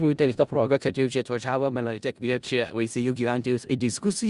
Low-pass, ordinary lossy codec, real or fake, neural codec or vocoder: 10.8 kHz; Opus, 64 kbps; fake; codec, 16 kHz in and 24 kHz out, 0.4 kbps, LongCat-Audio-Codec, four codebook decoder